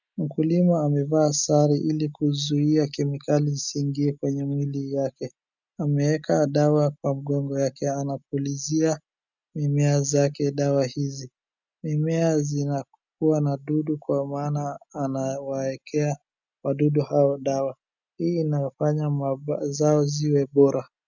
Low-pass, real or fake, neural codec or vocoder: 7.2 kHz; real; none